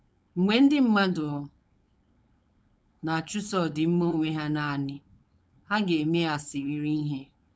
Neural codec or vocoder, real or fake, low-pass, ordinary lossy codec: codec, 16 kHz, 4.8 kbps, FACodec; fake; none; none